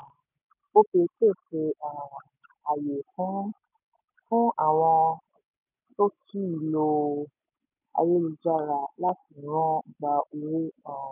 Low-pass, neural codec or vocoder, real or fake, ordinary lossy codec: 3.6 kHz; none; real; none